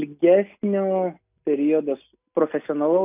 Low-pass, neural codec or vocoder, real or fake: 3.6 kHz; none; real